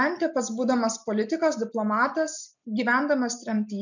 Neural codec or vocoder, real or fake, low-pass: none; real; 7.2 kHz